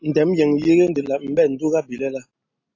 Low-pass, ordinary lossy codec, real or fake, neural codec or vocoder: 7.2 kHz; AAC, 48 kbps; real; none